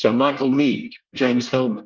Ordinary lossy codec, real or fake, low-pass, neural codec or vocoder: Opus, 24 kbps; fake; 7.2 kHz; codec, 24 kHz, 1 kbps, SNAC